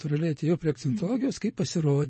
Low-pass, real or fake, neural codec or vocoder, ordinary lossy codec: 9.9 kHz; fake; vocoder, 22.05 kHz, 80 mel bands, Vocos; MP3, 32 kbps